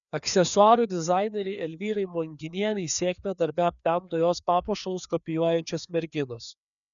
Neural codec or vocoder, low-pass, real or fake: codec, 16 kHz, 2 kbps, FreqCodec, larger model; 7.2 kHz; fake